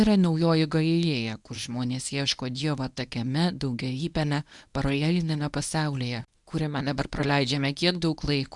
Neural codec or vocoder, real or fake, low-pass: codec, 24 kHz, 0.9 kbps, WavTokenizer, medium speech release version 1; fake; 10.8 kHz